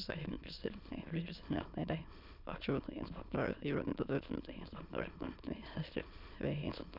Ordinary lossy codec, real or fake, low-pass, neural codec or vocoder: none; fake; 5.4 kHz; autoencoder, 22.05 kHz, a latent of 192 numbers a frame, VITS, trained on many speakers